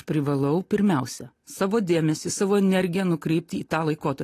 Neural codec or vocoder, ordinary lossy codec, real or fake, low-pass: none; AAC, 48 kbps; real; 14.4 kHz